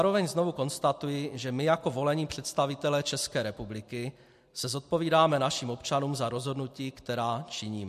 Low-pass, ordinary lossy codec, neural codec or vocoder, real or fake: 14.4 kHz; MP3, 64 kbps; none; real